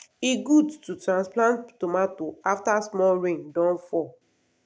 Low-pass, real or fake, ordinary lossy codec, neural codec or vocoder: none; real; none; none